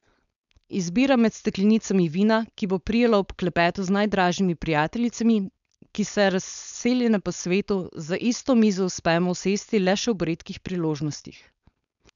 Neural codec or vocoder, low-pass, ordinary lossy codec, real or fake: codec, 16 kHz, 4.8 kbps, FACodec; 7.2 kHz; none; fake